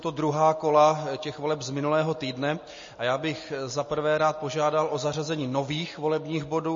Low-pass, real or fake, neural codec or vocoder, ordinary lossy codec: 7.2 kHz; real; none; MP3, 32 kbps